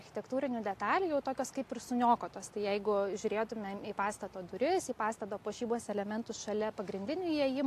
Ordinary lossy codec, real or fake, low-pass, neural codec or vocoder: AAC, 64 kbps; real; 14.4 kHz; none